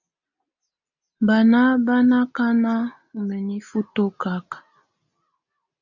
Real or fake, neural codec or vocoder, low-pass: real; none; 7.2 kHz